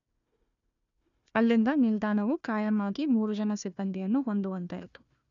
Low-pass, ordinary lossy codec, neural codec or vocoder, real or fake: 7.2 kHz; none; codec, 16 kHz, 1 kbps, FunCodec, trained on Chinese and English, 50 frames a second; fake